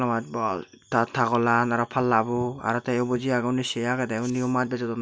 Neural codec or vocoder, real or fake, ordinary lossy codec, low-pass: none; real; none; none